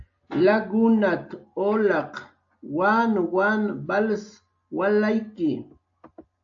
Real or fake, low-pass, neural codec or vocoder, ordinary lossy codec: real; 7.2 kHz; none; AAC, 64 kbps